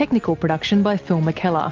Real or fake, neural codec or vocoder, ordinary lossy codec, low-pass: real; none; Opus, 32 kbps; 7.2 kHz